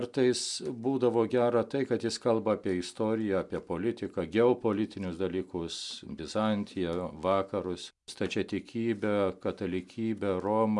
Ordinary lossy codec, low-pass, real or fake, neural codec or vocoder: MP3, 96 kbps; 10.8 kHz; real; none